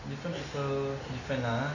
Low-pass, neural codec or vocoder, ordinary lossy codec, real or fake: 7.2 kHz; none; none; real